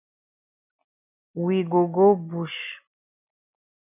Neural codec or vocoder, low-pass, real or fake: none; 3.6 kHz; real